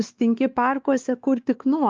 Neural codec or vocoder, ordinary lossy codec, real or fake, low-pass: codec, 16 kHz, 2 kbps, X-Codec, WavLM features, trained on Multilingual LibriSpeech; Opus, 24 kbps; fake; 7.2 kHz